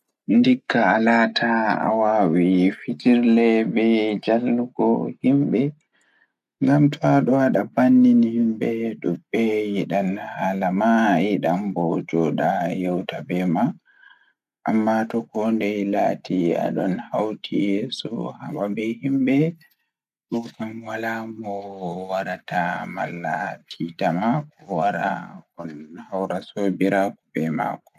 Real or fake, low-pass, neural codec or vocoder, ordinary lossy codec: real; 14.4 kHz; none; none